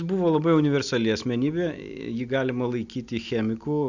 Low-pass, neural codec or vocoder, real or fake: 7.2 kHz; none; real